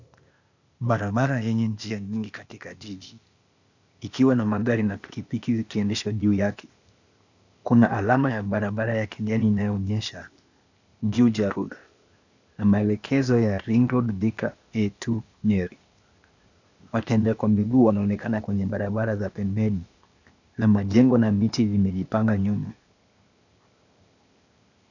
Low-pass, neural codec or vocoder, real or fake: 7.2 kHz; codec, 16 kHz, 0.8 kbps, ZipCodec; fake